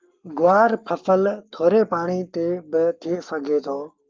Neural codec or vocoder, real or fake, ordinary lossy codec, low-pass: codec, 44.1 kHz, 7.8 kbps, Pupu-Codec; fake; Opus, 24 kbps; 7.2 kHz